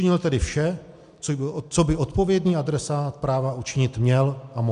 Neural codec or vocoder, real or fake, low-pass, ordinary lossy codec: none; real; 10.8 kHz; MP3, 64 kbps